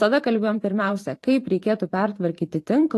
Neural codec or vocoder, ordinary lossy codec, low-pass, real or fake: vocoder, 44.1 kHz, 128 mel bands every 512 samples, BigVGAN v2; AAC, 48 kbps; 14.4 kHz; fake